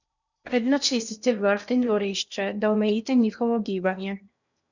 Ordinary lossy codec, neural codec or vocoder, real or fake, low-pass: none; codec, 16 kHz in and 24 kHz out, 0.8 kbps, FocalCodec, streaming, 65536 codes; fake; 7.2 kHz